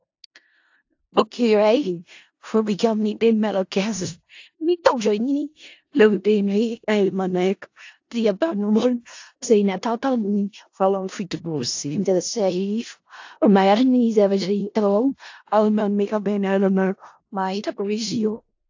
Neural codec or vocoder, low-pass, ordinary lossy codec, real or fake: codec, 16 kHz in and 24 kHz out, 0.4 kbps, LongCat-Audio-Codec, four codebook decoder; 7.2 kHz; AAC, 48 kbps; fake